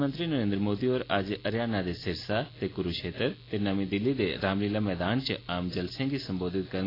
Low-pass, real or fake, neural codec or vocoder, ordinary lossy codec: 5.4 kHz; real; none; AAC, 24 kbps